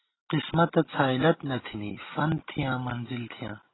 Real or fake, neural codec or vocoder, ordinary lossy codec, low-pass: real; none; AAC, 16 kbps; 7.2 kHz